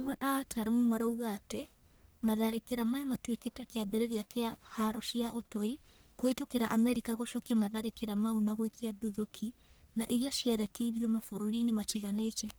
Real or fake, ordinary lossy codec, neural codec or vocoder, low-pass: fake; none; codec, 44.1 kHz, 1.7 kbps, Pupu-Codec; none